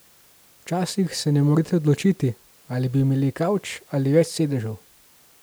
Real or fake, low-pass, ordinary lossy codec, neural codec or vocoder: real; none; none; none